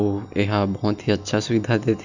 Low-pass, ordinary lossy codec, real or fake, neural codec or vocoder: 7.2 kHz; none; real; none